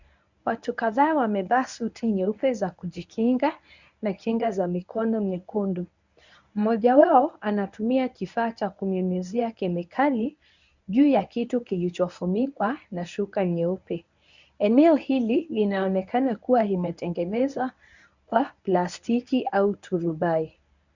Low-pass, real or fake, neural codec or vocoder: 7.2 kHz; fake; codec, 24 kHz, 0.9 kbps, WavTokenizer, medium speech release version 1